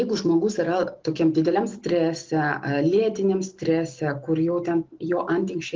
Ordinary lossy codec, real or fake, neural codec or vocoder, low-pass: Opus, 16 kbps; real; none; 7.2 kHz